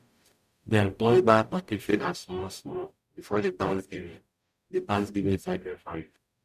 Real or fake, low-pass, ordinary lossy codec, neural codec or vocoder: fake; 14.4 kHz; MP3, 96 kbps; codec, 44.1 kHz, 0.9 kbps, DAC